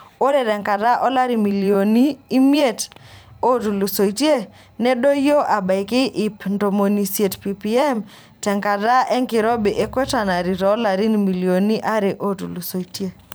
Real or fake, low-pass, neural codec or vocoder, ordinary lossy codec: fake; none; vocoder, 44.1 kHz, 128 mel bands every 512 samples, BigVGAN v2; none